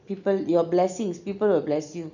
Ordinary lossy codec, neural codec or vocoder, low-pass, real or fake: none; none; 7.2 kHz; real